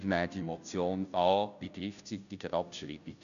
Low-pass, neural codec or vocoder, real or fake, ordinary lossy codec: 7.2 kHz; codec, 16 kHz, 0.5 kbps, FunCodec, trained on Chinese and English, 25 frames a second; fake; none